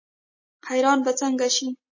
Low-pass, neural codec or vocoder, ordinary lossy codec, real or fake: 7.2 kHz; none; MP3, 32 kbps; real